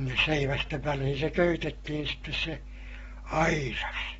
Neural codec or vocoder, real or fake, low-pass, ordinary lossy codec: none; real; 19.8 kHz; AAC, 24 kbps